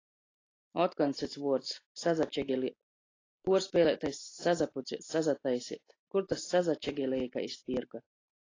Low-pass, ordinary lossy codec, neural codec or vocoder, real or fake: 7.2 kHz; AAC, 32 kbps; none; real